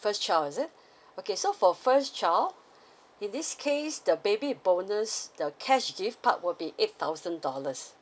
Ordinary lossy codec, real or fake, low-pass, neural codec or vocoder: none; real; none; none